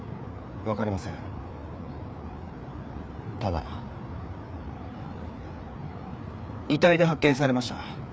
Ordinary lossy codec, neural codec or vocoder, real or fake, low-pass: none; codec, 16 kHz, 4 kbps, FreqCodec, larger model; fake; none